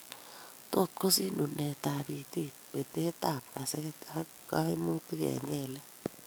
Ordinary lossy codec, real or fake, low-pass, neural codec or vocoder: none; fake; none; codec, 44.1 kHz, 7.8 kbps, DAC